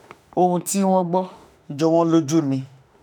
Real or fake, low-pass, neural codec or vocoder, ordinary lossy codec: fake; 19.8 kHz; autoencoder, 48 kHz, 32 numbers a frame, DAC-VAE, trained on Japanese speech; none